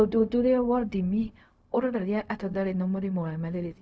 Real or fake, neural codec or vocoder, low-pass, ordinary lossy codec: fake; codec, 16 kHz, 0.4 kbps, LongCat-Audio-Codec; none; none